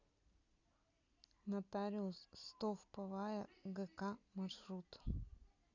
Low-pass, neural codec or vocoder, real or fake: 7.2 kHz; none; real